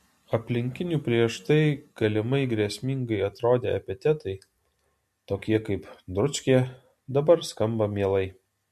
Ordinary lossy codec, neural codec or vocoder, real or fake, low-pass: MP3, 64 kbps; none; real; 14.4 kHz